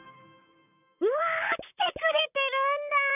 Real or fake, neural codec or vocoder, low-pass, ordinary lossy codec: fake; vocoder, 44.1 kHz, 128 mel bands, Pupu-Vocoder; 3.6 kHz; none